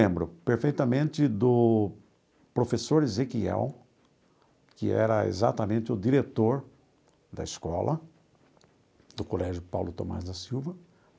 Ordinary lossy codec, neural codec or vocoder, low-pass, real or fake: none; none; none; real